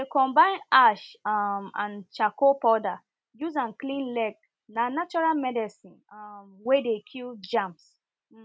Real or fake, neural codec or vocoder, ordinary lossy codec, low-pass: real; none; none; none